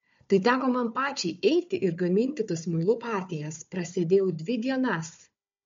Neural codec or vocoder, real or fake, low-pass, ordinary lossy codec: codec, 16 kHz, 4 kbps, FunCodec, trained on Chinese and English, 50 frames a second; fake; 7.2 kHz; MP3, 48 kbps